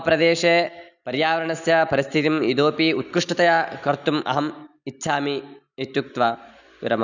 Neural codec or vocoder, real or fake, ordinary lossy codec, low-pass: none; real; none; 7.2 kHz